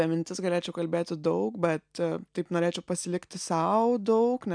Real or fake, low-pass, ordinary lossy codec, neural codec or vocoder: real; 9.9 kHz; MP3, 96 kbps; none